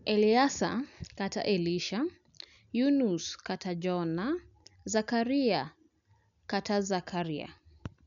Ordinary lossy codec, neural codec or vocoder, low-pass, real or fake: none; none; 7.2 kHz; real